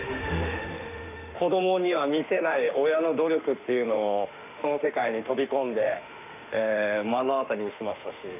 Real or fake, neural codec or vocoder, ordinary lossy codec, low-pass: fake; autoencoder, 48 kHz, 32 numbers a frame, DAC-VAE, trained on Japanese speech; none; 3.6 kHz